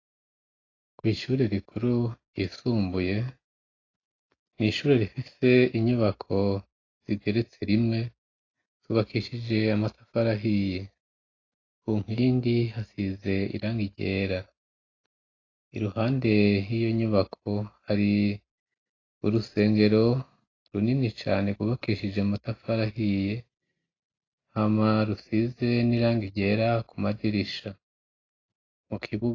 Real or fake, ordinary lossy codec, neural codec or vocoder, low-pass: real; AAC, 32 kbps; none; 7.2 kHz